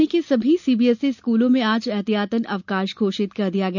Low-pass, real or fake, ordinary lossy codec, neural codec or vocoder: 7.2 kHz; real; none; none